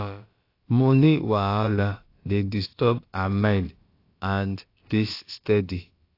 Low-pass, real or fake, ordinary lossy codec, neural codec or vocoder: 5.4 kHz; fake; AAC, 32 kbps; codec, 16 kHz, about 1 kbps, DyCAST, with the encoder's durations